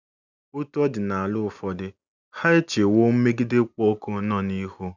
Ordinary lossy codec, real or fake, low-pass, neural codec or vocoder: none; real; 7.2 kHz; none